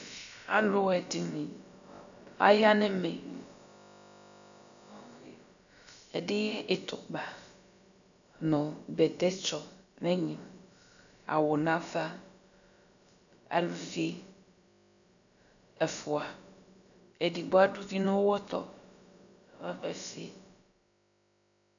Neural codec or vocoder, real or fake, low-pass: codec, 16 kHz, about 1 kbps, DyCAST, with the encoder's durations; fake; 7.2 kHz